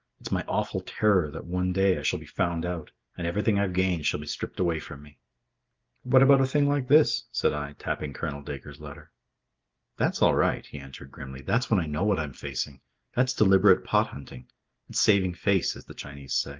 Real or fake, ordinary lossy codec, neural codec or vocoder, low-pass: real; Opus, 32 kbps; none; 7.2 kHz